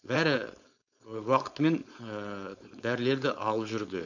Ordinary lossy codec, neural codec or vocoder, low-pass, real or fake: none; codec, 16 kHz, 4.8 kbps, FACodec; 7.2 kHz; fake